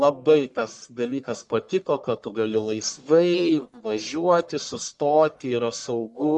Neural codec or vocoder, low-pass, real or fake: codec, 44.1 kHz, 1.7 kbps, Pupu-Codec; 10.8 kHz; fake